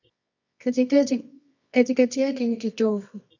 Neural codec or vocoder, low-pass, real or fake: codec, 24 kHz, 0.9 kbps, WavTokenizer, medium music audio release; 7.2 kHz; fake